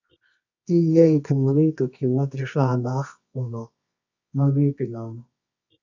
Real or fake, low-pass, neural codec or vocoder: fake; 7.2 kHz; codec, 24 kHz, 0.9 kbps, WavTokenizer, medium music audio release